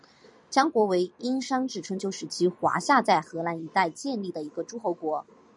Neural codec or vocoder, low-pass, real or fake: vocoder, 44.1 kHz, 128 mel bands every 256 samples, BigVGAN v2; 10.8 kHz; fake